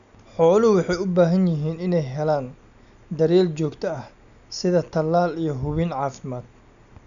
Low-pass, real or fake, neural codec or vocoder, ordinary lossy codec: 7.2 kHz; real; none; none